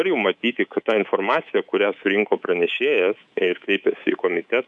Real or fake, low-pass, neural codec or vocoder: fake; 10.8 kHz; codec, 24 kHz, 3.1 kbps, DualCodec